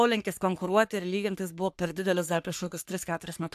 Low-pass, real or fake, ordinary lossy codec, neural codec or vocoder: 14.4 kHz; fake; AAC, 96 kbps; codec, 44.1 kHz, 3.4 kbps, Pupu-Codec